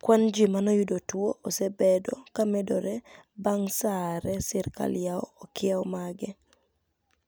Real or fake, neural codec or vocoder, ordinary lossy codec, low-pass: real; none; none; none